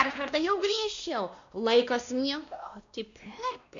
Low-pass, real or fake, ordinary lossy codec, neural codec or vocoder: 7.2 kHz; fake; AAC, 64 kbps; codec, 16 kHz, 1 kbps, X-Codec, WavLM features, trained on Multilingual LibriSpeech